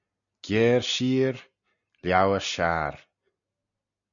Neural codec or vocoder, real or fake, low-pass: none; real; 7.2 kHz